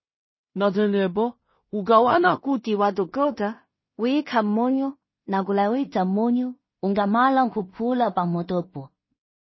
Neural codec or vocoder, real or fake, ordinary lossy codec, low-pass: codec, 16 kHz in and 24 kHz out, 0.4 kbps, LongCat-Audio-Codec, two codebook decoder; fake; MP3, 24 kbps; 7.2 kHz